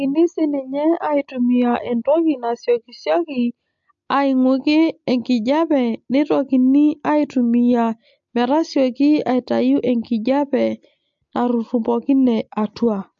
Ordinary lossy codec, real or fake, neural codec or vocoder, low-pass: MP3, 48 kbps; real; none; 7.2 kHz